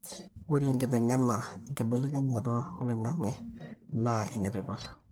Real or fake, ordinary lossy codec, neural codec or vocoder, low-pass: fake; none; codec, 44.1 kHz, 1.7 kbps, Pupu-Codec; none